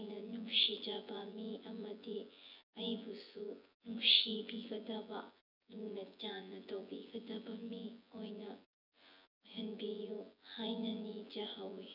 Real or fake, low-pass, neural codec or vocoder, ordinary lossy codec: fake; 5.4 kHz; vocoder, 24 kHz, 100 mel bands, Vocos; none